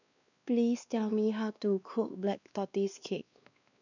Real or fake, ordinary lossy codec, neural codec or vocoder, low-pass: fake; none; codec, 16 kHz, 2 kbps, X-Codec, WavLM features, trained on Multilingual LibriSpeech; 7.2 kHz